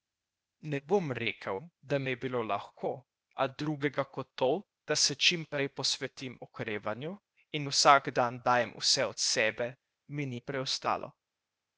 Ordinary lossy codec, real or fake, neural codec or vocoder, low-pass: none; fake; codec, 16 kHz, 0.8 kbps, ZipCodec; none